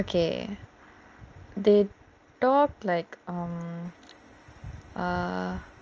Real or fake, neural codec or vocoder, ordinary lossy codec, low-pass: real; none; Opus, 24 kbps; 7.2 kHz